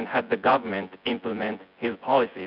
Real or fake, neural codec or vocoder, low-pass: fake; vocoder, 24 kHz, 100 mel bands, Vocos; 5.4 kHz